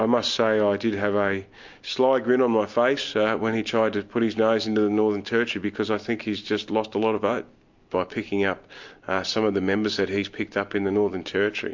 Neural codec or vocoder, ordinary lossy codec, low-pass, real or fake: none; MP3, 48 kbps; 7.2 kHz; real